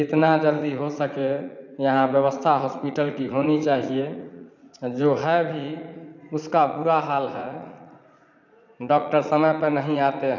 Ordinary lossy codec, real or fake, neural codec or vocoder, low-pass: none; fake; vocoder, 44.1 kHz, 80 mel bands, Vocos; 7.2 kHz